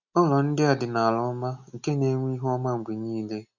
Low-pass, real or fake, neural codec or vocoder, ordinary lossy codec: 7.2 kHz; real; none; none